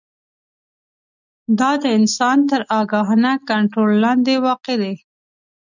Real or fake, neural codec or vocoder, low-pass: real; none; 7.2 kHz